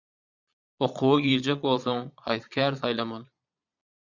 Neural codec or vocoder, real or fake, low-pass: vocoder, 22.05 kHz, 80 mel bands, Vocos; fake; 7.2 kHz